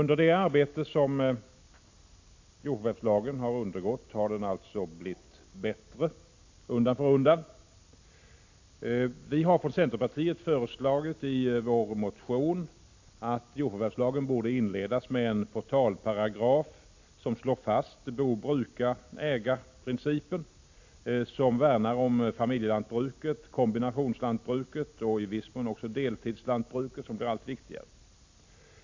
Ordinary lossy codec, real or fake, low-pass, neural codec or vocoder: AAC, 48 kbps; real; 7.2 kHz; none